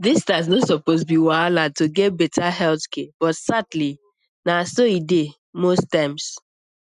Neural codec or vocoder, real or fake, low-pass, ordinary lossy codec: none; real; 10.8 kHz; none